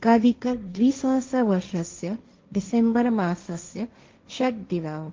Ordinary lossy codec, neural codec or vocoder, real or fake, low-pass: Opus, 24 kbps; codec, 16 kHz, 1.1 kbps, Voila-Tokenizer; fake; 7.2 kHz